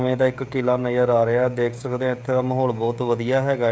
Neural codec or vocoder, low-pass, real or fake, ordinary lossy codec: codec, 16 kHz, 16 kbps, FreqCodec, smaller model; none; fake; none